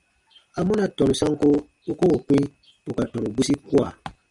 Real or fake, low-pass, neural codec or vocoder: real; 10.8 kHz; none